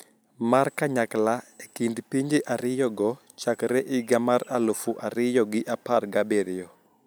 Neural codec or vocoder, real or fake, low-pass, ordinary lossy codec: none; real; none; none